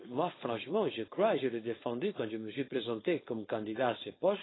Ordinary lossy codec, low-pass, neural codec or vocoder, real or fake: AAC, 16 kbps; 7.2 kHz; codec, 16 kHz, 4.8 kbps, FACodec; fake